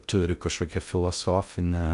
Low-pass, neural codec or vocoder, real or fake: 10.8 kHz; codec, 16 kHz in and 24 kHz out, 0.6 kbps, FocalCodec, streaming, 2048 codes; fake